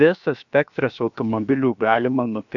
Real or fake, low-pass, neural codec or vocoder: fake; 7.2 kHz; codec, 16 kHz, about 1 kbps, DyCAST, with the encoder's durations